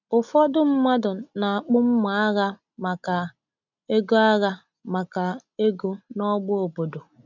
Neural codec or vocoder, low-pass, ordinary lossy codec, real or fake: none; 7.2 kHz; none; real